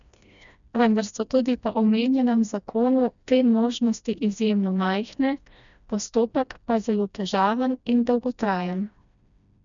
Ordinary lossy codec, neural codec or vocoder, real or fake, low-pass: none; codec, 16 kHz, 1 kbps, FreqCodec, smaller model; fake; 7.2 kHz